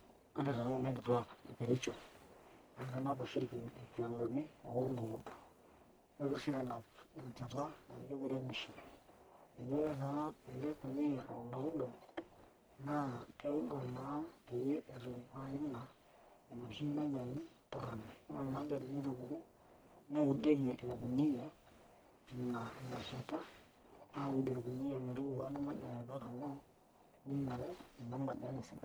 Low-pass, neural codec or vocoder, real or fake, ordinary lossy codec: none; codec, 44.1 kHz, 1.7 kbps, Pupu-Codec; fake; none